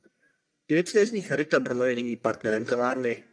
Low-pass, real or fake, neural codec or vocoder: 9.9 kHz; fake; codec, 44.1 kHz, 1.7 kbps, Pupu-Codec